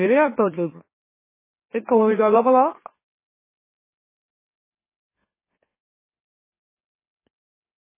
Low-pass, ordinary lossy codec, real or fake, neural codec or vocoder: 3.6 kHz; MP3, 16 kbps; fake; autoencoder, 44.1 kHz, a latent of 192 numbers a frame, MeloTTS